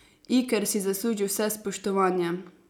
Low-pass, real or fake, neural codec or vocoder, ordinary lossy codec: none; real; none; none